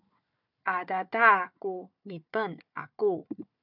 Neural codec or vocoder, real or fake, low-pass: codec, 16 kHz, 4 kbps, FunCodec, trained on Chinese and English, 50 frames a second; fake; 5.4 kHz